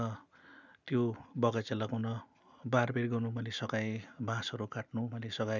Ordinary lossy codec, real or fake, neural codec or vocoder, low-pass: none; real; none; 7.2 kHz